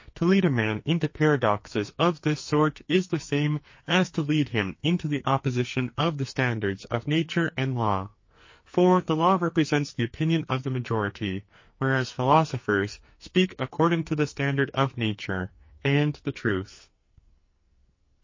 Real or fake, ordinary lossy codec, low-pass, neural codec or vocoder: fake; MP3, 32 kbps; 7.2 kHz; codec, 44.1 kHz, 2.6 kbps, SNAC